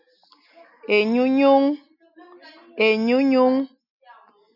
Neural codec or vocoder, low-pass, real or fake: none; 5.4 kHz; real